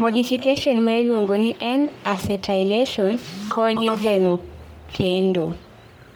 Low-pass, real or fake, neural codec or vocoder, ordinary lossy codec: none; fake; codec, 44.1 kHz, 1.7 kbps, Pupu-Codec; none